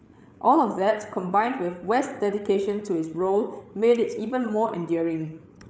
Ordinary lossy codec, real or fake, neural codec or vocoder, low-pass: none; fake; codec, 16 kHz, 8 kbps, FreqCodec, larger model; none